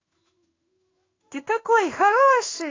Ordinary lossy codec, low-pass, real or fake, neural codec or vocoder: AAC, 32 kbps; 7.2 kHz; fake; codec, 16 kHz in and 24 kHz out, 1 kbps, XY-Tokenizer